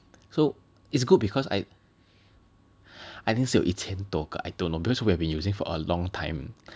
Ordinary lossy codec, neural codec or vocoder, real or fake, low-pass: none; none; real; none